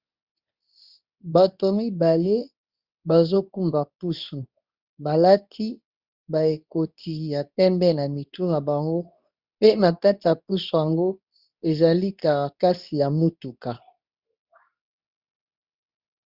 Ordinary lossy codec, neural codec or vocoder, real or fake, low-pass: Opus, 64 kbps; codec, 24 kHz, 0.9 kbps, WavTokenizer, medium speech release version 2; fake; 5.4 kHz